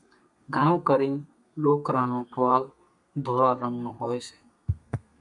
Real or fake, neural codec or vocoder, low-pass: fake; codec, 32 kHz, 1.9 kbps, SNAC; 10.8 kHz